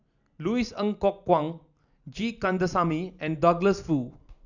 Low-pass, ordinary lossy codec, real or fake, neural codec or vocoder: 7.2 kHz; none; real; none